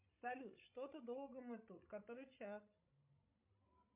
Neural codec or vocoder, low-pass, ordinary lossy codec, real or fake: codec, 16 kHz, 16 kbps, FreqCodec, larger model; 3.6 kHz; MP3, 32 kbps; fake